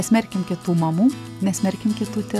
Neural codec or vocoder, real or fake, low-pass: none; real; 14.4 kHz